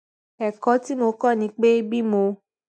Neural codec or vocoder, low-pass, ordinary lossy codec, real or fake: none; none; none; real